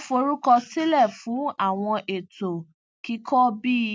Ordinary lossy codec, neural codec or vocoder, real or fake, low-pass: none; none; real; none